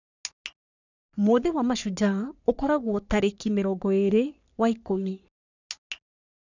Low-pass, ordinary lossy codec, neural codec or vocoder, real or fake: 7.2 kHz; none; codec, 44.1 kHz, 3.4 kbps, Pupu-Codec; fake